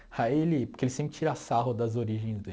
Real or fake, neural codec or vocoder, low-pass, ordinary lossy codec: real; none; none; none